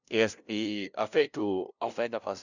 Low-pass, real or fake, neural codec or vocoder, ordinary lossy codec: 7.2 kHz; fake; codec, 16 kHz, 0.5 kbps, FunCodec, trained on LibriTTS, 25 frames a second; none